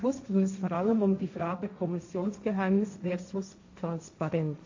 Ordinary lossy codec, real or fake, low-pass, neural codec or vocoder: AAC, 48 kbps; fake; 7.2 kHz; codec, 16 kHz, 1.1 kbps, Voila-Tokenizer